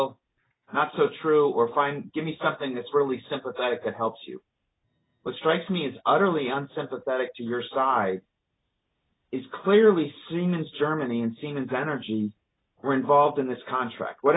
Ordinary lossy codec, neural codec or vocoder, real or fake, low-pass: AAC, 16 kbps; none; real; 7.2 kHz